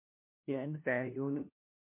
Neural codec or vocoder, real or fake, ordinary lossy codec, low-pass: codec, 16 kHz, 1 kbps, FunCodec, trained on LibriTTS, 50 frames a second; fake; MP3, 24 kbps; 3.6 kHz